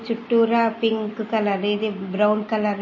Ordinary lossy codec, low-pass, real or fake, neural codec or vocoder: MP3, 32 kbps; 7.2 kHz; real; none